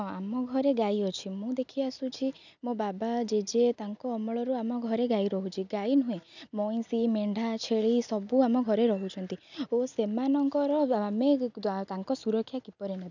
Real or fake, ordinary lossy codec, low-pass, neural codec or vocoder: real; none; 7.2 kHz; none